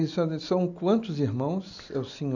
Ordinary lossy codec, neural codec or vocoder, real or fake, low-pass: none; none; real; 7.2 kHz